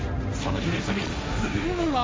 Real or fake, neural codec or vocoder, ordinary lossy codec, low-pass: fake; codec, 16 kHz, 1.1 kbps, Voila-Tokenizer; none; none